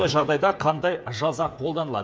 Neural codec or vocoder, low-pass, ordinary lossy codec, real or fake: codec, 16 kHz, 8 kbps, FreqCodec, smaller model; none; none; fake